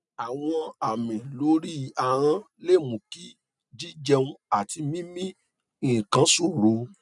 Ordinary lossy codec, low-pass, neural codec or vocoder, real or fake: none; 10.8 kHz; none; real